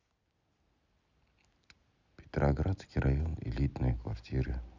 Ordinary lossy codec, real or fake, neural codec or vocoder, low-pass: Opus, 64 kbps; real; none; 7.2 kHz